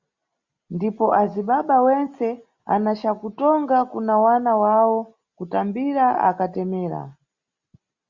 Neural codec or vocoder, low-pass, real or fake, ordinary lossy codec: none; 7.2 kHz; real; Opus, 64 kbps